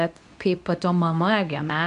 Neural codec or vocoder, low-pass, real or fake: codec, 24 kHz, 0.9 kbps, WavTokenizer, medium speech release version 2; 10.8 kHz; fake